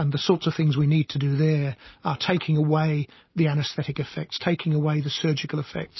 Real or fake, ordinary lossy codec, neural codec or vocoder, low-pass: real; MP3, 24 kbps; none; 7.2 kHz